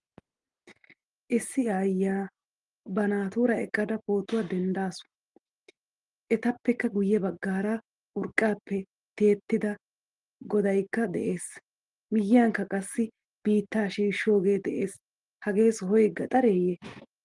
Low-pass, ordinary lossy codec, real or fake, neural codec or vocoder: 10.8 kHz; Opus, 24 kbps; real; none